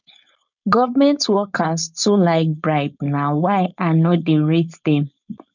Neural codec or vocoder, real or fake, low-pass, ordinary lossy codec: codec, 16 kHz, 4.8 kbps, FACodec; fake; 7.2 kHz; none